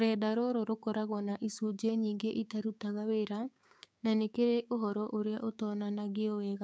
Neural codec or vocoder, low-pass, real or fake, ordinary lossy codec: codec, 16 kHz, 6 kbps, DAC; none; fake; none